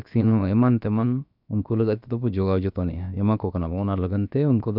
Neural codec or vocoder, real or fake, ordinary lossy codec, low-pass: codec, 16 kHz, about 1 kbps, DyCAST, with the encoder's durations; fake; none; 5.4 kHz